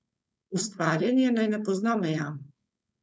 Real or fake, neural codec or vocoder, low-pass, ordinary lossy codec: fake; codec, 16 kHz, 4.8 kbps, FACodec; none; none